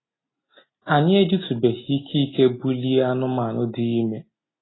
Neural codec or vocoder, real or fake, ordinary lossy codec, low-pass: none; real; AAC, 16 kbps; 7.2 kHz